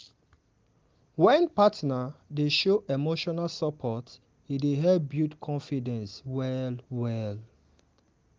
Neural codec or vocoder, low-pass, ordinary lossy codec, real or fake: none; 7.2 kHz; Opus, 24 kbps; real